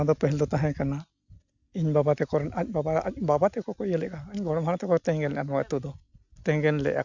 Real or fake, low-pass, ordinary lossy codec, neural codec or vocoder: real; 7.2 kHz; none; none